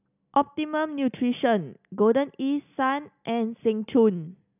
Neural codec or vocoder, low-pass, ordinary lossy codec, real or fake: none; 3.6 kHz; none; real